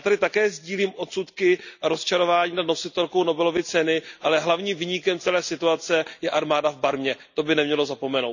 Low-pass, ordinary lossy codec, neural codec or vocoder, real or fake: 7.2 kHz; none; none; real